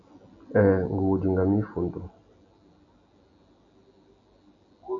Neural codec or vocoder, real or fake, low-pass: none; real; 7.2 kHz